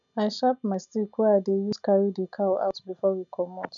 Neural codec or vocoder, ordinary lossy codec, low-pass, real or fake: none; none; 7.2 kHz; real